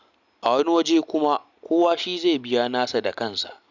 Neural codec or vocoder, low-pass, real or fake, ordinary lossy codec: none; 7.2 kHz; real; none